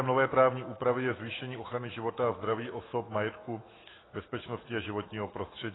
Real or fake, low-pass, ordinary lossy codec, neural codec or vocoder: fake; 7.2 kHz; AAC, 16 kbps; autoencoder, 48 kHz, 128 numbers a frame, DAC-VAE, trained on Japanese speech